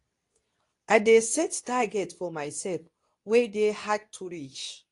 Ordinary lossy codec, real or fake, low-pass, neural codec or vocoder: AAC, 48 kbps; fake; 10.8 kHz; codec, 24 kHz, 0.9 kbps, WavTokenizer, medium speech release version 2